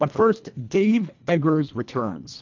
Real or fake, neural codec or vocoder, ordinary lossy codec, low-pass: fake; codec, 24 kHz, 1.5 kbps, HILCodec; MP3, 64 kbps; 7.2 kHz